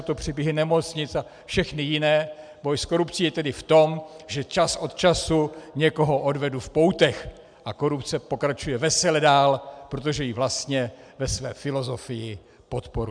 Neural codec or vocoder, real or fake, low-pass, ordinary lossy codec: none; real; 9.9 kHz; MP3, 96 kbps